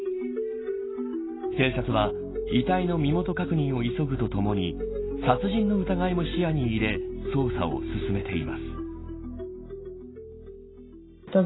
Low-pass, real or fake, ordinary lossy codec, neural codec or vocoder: 7.2 kHz; real; AAC, 16 kbps; none